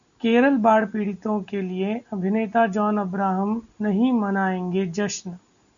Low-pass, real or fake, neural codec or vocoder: 7.2 kHz; real; none